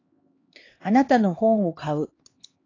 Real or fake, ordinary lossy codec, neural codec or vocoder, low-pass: fake; AAC, 32 kbps; codec, 16 kHz, 2 kbps, X-Codec, HuBERT features, trained on LibriSpeech; 7.2 kHz